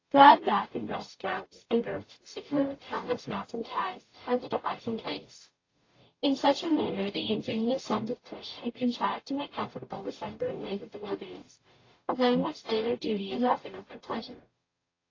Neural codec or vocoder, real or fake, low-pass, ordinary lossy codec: codec, 44.1 kHz, 0.9 kbps, DAC; fake; 7.2 kHz; AAC, 32 kbps